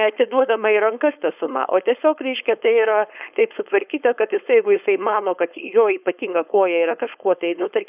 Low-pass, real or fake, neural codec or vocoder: 3.6 kHz; fake; codec, 16 kHz, 4.8 kbps, FACodec